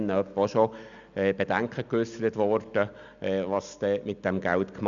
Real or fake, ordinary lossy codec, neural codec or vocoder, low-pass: real; none; none; 7.2 kHz